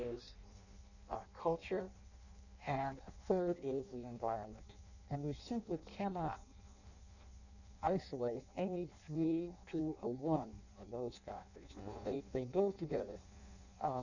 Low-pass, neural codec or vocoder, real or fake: 7.2 kHz; codec, 16 kHz in and 24 kHz out, 0.6 kbps, FireRedTTS-2 codec; fake